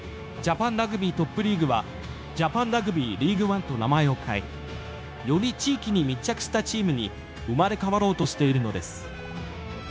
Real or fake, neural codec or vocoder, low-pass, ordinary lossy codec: fake; codec, 16 kHz, 0.9 kbps, LongCat-Audio-Codec; none; none